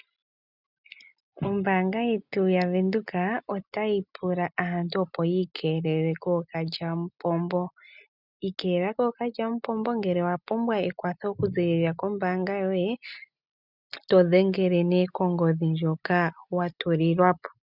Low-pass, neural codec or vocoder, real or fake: 5.4 kHz; none; real